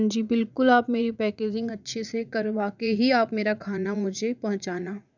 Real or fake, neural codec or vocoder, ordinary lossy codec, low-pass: fake; vocoder, 44.1 kHz, 80 mel bands, Vocos; none; 7.2 kHz